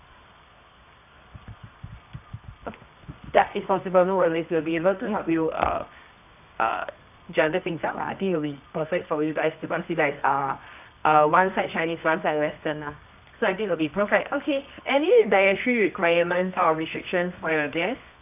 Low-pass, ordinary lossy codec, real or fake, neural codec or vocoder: 3.6 kHz; none; fake; codec, 24 kHz, 0.9 kbps, WavTokenizer, medium music audio release